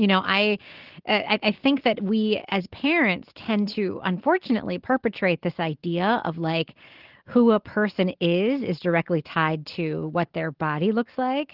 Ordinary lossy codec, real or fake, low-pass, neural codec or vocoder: Opus, 16 kbps; real; 5.4 kHz; none